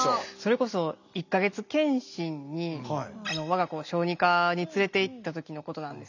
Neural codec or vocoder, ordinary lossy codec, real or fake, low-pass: none; none; real; 7.2 kHz